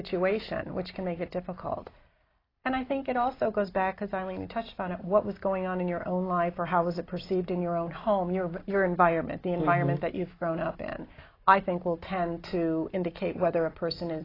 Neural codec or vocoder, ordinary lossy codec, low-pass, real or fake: none; AAC, 24 kbps; 5.4 kHz; real